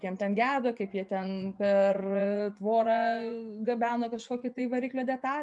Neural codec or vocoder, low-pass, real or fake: vocoder, 24 kHz, 100 mel bands, Vocos; 10.8 kHz; fake